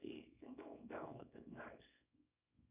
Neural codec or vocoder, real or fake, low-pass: codec, 24 kHz, 0.9 kbps, WavTokenizer, small release; fake; 3.6 kHz